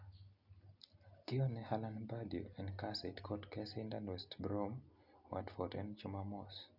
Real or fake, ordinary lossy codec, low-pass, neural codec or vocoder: real; none; 5.4 kHz; none